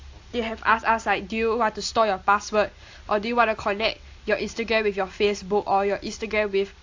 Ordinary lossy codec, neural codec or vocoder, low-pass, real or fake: AAC, 48 kbps; none; 7.2 kHz; real